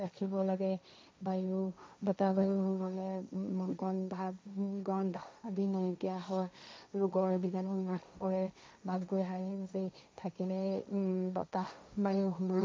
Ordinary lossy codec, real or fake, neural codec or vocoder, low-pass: none; fake; codec, 16 kHz, 1.1 kbps, Voila-Tokenizer; none